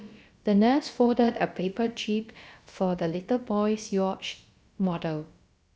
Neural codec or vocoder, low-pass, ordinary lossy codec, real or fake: codec, 16 kHz, about 1 kbps, DyCAST, with the encoder's durations; none; none; fake